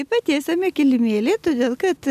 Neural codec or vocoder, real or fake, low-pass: none; real; 14.4 kHz